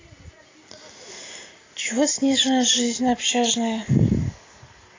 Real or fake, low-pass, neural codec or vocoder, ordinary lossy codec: real; 7.2 kHz; none; AAC, 32 kbps